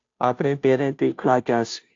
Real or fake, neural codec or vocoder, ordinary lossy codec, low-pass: fake; codec, 16 kHz, 0.5 kbps, FunCodec, trained on Chinese and English, 25 frames a second; AAC, 48 kbps; 7.2 kHz